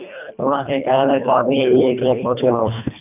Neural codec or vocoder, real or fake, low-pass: codec, 24 kHz, 1.5 kbps, HILCodec; fake; 3.6 kHz